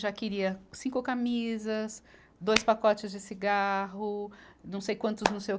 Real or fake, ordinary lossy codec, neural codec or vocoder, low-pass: real; none; none; none